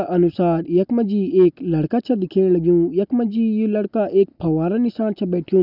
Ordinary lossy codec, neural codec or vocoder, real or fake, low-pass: none; none; real; 5.4 kHz